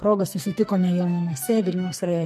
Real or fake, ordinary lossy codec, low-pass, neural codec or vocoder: fake; MP3, 64 kbps; 14.4 kHz; codec, 44.1 kHz, 2.6 kbps, SNAC